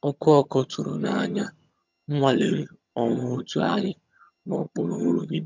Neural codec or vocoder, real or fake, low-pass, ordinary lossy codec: vocoder, 22.05 kHz, 80 mel bands, HiFi-GAN; fake; 7.2 kHz; MP3, 64 kbps